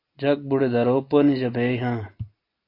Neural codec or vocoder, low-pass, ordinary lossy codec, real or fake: none; 5.4 kHz; AAC, 32 kbps; real